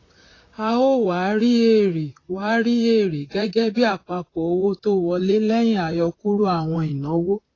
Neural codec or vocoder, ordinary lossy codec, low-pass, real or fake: vocoder, 44.1 kHz, 128 mel bands, Pupu-Vocoder; AAC, 32 kbps; 7.2 kHz; fake